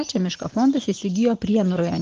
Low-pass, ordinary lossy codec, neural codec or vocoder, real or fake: 7.2 kHz; Opus, 16 kbps; none; real